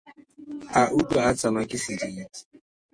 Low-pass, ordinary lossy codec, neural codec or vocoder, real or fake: 9.9 kHz; MP3, 48 kbps; vocoder, 48 kHz, 128 mel bands, Vocos; fake